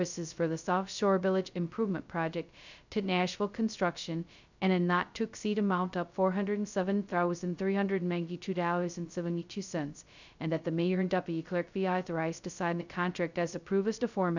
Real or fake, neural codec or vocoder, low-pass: fake; codec, 16 kHz, 0.2 kbps, FocalCodec; 7.2 kHz